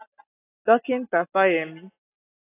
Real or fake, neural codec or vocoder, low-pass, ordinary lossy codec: real; none; 3.6 kHz; AAC, 16 kbps